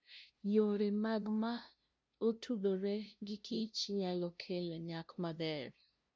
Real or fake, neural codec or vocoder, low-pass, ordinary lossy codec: fake; codec, 16 kHz, 0.5 kbps, FunCodec, trained on LibriTTS, 25 frames a second; none; none